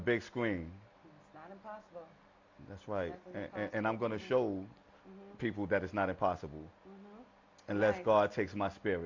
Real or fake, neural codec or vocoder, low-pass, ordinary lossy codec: real; none; 7.2 kHz; MP3, 48 kbps